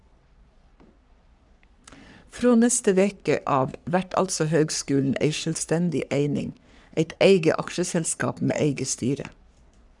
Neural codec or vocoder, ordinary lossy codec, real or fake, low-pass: codec, 44.1 kHz, 3.4 kbps, Pupu-Codec; none; fake; 10.8 kHz